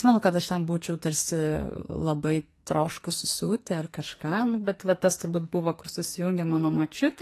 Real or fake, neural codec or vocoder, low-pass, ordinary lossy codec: fake; codec, 44.1 kHz, 2.6 kbps, SNAC; 14.4 kHz; AAC, 48 kbps